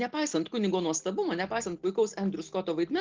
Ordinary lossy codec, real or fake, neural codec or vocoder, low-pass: Opus, 16 kbps; real; none; 7.2 kHz